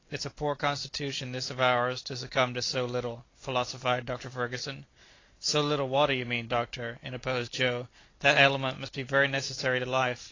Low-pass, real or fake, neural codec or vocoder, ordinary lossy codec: 7.2 kHz; real; none; AAC, 32 kbps